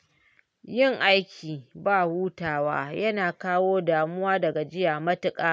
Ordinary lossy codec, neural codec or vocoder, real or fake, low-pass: none; none; real; none